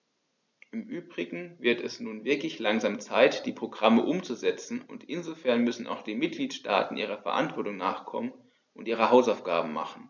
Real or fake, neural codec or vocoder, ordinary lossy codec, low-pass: real; none; none; 7.2 kHz